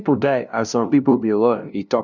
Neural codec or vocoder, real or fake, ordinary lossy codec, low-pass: codec, 16 kHz, 0.5 kbps, FunCodec, trained on LibriTTS, 25 frames a second; fake; none; 7.2 kHz